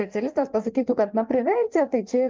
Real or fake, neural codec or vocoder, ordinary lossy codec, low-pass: fake; codec, 16 kHz in and 24 kHz out, 1.1 kbps, FireRedTTS-2 codec; Opus, 32 kbps; 7.2 kHz